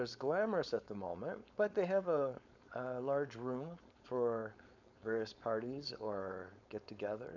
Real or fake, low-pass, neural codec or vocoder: fake; 7.2 kHz; codec, 16 kHz, 4.8 kbps, FACodec